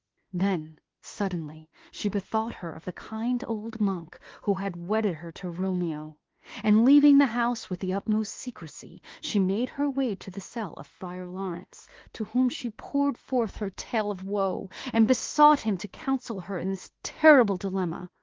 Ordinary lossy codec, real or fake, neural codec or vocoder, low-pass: Opus, 16 kbps; fake; autoencoder, 48 kHz, 32 numbers a frame, DAC-VAE, trained on Japanese speech; 7.2 kHz